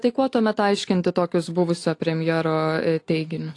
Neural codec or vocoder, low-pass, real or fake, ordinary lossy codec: none; 10.8 kHz; real; AAC, 48 kbps